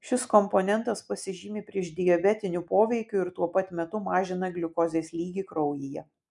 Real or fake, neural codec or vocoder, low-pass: real; none; 10.8 kHz